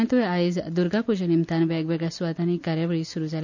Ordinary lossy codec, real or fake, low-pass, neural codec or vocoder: none; real; 7.2 kHz; none